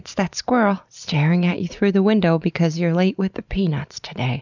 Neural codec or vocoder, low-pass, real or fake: none; 7.2 kHz; real